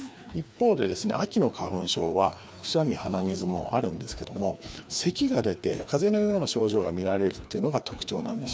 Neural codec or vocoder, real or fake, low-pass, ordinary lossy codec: codec, 16 kHz, 2 kbps, FreqCodec, larger model; fake; none; none